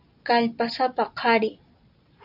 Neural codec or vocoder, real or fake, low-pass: none; real; 5.4 kHz